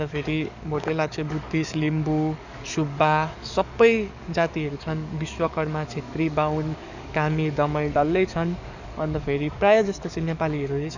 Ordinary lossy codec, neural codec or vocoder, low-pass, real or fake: none; codec, 44.1 kHz, 7.8 kbps, DAC; 7.2 kHz; fake